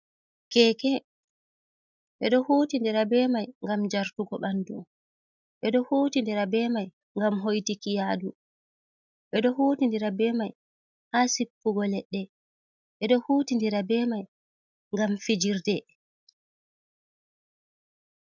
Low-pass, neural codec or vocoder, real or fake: 7.2 kHz; none; real